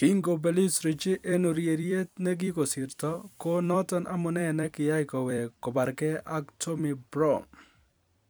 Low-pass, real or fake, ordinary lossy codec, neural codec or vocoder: none; fake; none; vocoder, 44.1 kHz, 128 mel bands every 256 samples, BigVGAN v2